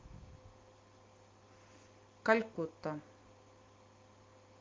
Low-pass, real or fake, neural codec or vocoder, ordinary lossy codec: 7.2 kHz; real; none; Opus, 32 kbps